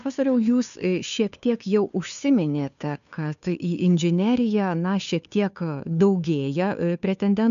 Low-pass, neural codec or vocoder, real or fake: 7.2 kHz; codec, 16 kHz, 2 kbps, FunCodec, trained on Chinese and English, 25 frames a second; fake